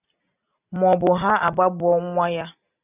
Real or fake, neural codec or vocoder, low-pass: real; none; 3.6 kHz